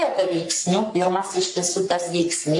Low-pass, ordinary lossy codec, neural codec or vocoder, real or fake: 10.8 kHz; AAC, 64 kbps; codec, 44.1 kHz, 3.4 kbps, Pupu-Codec; fake